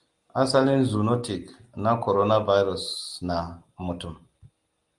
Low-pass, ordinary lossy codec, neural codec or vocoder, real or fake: 10.8 kHz; Opus, 32 kbps; none; real